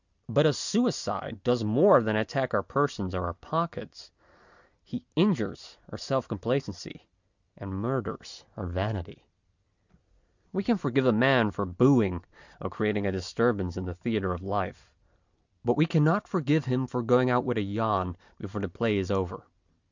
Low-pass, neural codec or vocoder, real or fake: 7.2 kHz; none; real